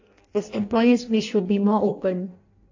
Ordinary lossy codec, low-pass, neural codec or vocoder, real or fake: AAC, 48 kbps; 7.2 kHz; codec, 16 kHz in and 24 kHz out, 0.6 kbps, FireRedTTS-2 codec; fake